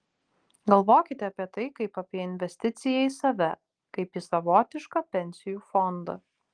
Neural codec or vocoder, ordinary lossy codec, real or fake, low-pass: none; Opus, 24 kbps; real; 9.9 kHz